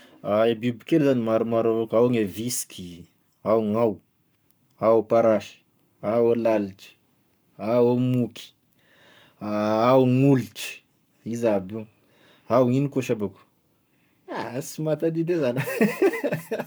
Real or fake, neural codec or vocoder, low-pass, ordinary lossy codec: fake; codec, 44.1 kHz, 7.8 kbps, Pupu-Codec; none; none